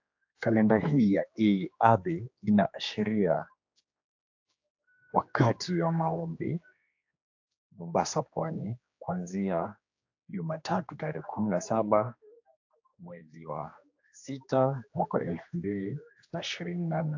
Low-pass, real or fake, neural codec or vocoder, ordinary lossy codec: 7.2 kHz; fake; codec, 16 kHz, 2 kbps, X-Codec, HuBERT features, trained on general audio; AAC, 48 kbps